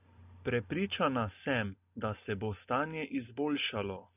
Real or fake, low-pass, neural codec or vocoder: real; 3.6 kHz; none